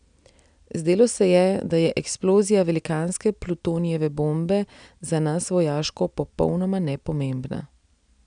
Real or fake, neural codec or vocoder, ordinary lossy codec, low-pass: real; none; none; 9.9 kHz